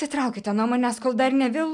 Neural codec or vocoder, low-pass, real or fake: none; 10.8 kHz; real